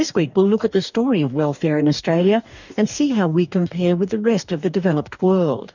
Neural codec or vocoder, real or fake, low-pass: codec, 44.1 kHz, 2.6 kbps, DAC; fake; 7.2 kHz